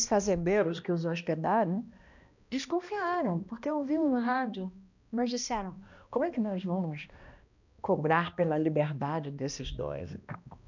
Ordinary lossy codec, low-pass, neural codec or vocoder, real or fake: none; 7.2 kHz; codec, 16 kHz, 1 kbps, X-Codec, HuBERT features, trained on balanced general audio; fake